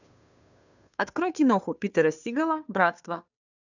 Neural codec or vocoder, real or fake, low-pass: codec, 16 kHz, 2 kbps, FunCodec, trained on Chinese and English, 25 frames a second; fake; 7.2 kHz